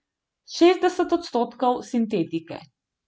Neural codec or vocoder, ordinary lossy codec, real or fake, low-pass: none; none; real; none